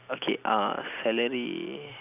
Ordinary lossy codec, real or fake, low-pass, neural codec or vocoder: none; real; 3.6 kHz; none